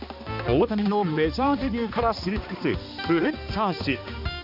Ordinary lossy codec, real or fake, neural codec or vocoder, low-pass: MP3, 48 kbps; fake; codec, 16 kHz, 2 kbps, X-Codec, HuBERT features, trained on balanced general audio; 5.4 kHz